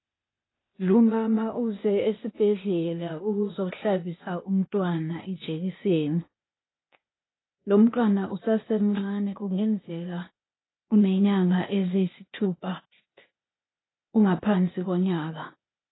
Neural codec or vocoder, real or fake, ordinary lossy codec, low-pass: codec, 16 kHz, 0.8 kbps, ZipCodec; fake; AAC, 16 kbps; 7.2 kHz